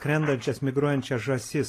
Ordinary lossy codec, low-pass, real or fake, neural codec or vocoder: AAC, 48 kbps; 14.4 kHz; real; none